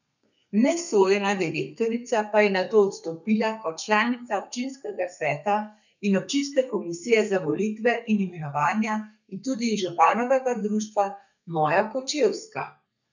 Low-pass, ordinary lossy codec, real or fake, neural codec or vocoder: 7.2 kHz; none; fake; codec, 32 kHz, 1.9 kbps, SNAC